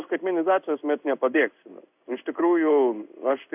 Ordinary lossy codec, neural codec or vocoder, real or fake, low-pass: AAC, 32 kbps; codec, 16 kHz in and 24 kHz out, 1 kbps, XY-Tokenizer; fake; 3.6 kHz